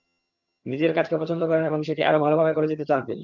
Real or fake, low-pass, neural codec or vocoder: fake; 7.2 kHz; vocoder, 22.05 kHz, 80 mel bands, HiFi-GAN